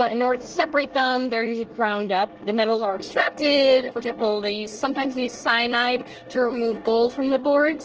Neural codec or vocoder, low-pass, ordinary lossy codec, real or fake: codec, 24 kHz, 1 kbps, SNAC; 7.2 kHz; Opus, 16 kbps; fake